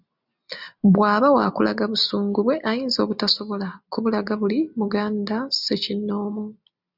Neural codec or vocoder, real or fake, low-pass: none; real; 5.4 kHz